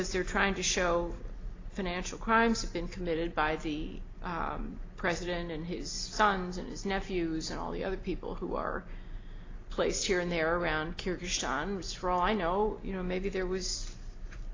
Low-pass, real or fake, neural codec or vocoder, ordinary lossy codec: 7.2 kHz; real; none; AAC, 32 kbps